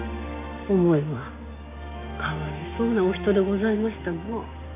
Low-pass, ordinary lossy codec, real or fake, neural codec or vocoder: 3.6 kHz; MP3, 24 kbps; fake; codec, 16 kHz, 6 kbps, DAC